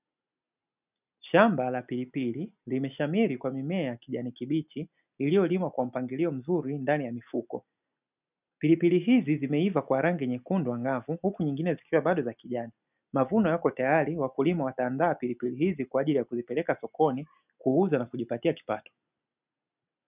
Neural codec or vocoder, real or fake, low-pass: none; real; 3.6 kHz